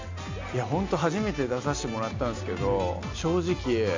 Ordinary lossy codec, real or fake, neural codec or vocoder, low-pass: MP3, 48 kbps; real; none; 7.2 kHz